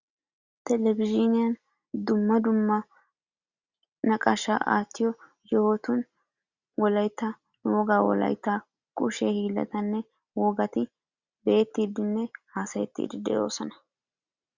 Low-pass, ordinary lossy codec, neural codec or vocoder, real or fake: 7.2 kHz; Opus, 64 kbps; none; real